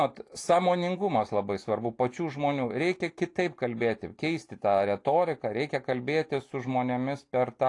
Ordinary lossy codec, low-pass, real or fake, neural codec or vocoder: AAC, 48 kbps; 10.8 kHz; real; none